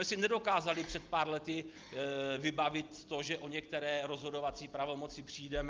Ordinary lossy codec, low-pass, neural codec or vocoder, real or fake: Opus, 24 kbps; 7.2 kHz; none; real